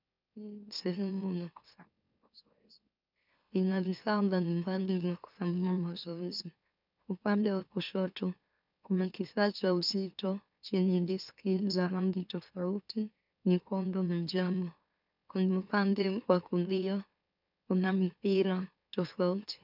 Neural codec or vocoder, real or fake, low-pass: autoencoder, 44.1 kHz, a latent of 192 numbers a frame, MeloTTS; fake; 5.4 kHz